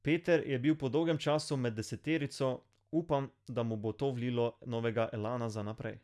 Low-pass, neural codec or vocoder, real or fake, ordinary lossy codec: none; none; real; none